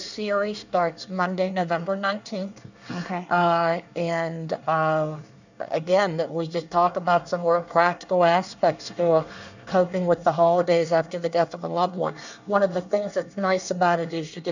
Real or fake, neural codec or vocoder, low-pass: fake; codec, 24 kHz, 1 kbps, SNAC; 7.2 kHz